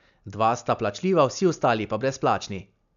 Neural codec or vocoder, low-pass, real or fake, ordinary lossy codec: none; 7.2 kHz; real; none